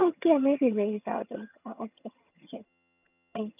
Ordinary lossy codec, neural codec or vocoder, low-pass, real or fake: none; vocoder, 22.05 kHz, 80 mel bands, HiFi-GAN; 3.6 kHz; fake